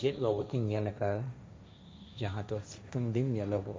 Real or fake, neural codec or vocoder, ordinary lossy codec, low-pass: fake; codec, 16 kHz, 1.1 kbps, Voila-Tokenizer; none; none